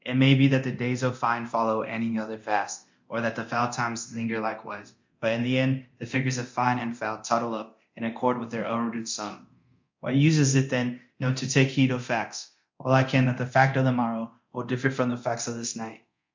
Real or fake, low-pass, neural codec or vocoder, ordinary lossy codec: fake; 7.2 kHz; codec, 24 kHz, 0.9 kbps, DualCodec; MP3, 48 kbps